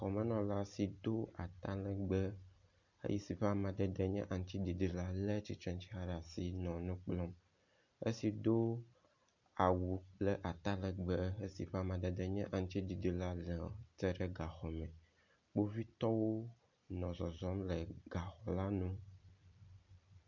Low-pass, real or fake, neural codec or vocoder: 7.2 kHz; real; none